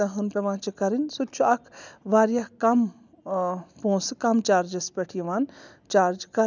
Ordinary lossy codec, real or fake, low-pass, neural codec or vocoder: none; real; 7.2 kHz; none